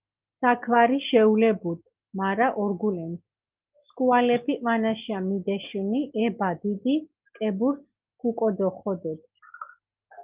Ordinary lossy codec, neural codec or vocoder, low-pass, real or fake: Opus, 32 kbps; none; 3.6 kHz; real